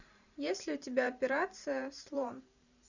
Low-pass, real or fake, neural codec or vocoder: 7.2 kHz; fake; vocoder, 44.1 kHz, 128 mel bands every 512 samples, BigVGAN v2